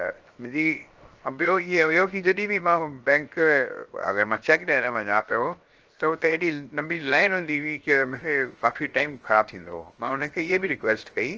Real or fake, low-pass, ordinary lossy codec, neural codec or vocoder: fake; 7.2 kHz; Opus, 24 kbps; codec, 16 kHz, 0.7 kbps, FocalCodec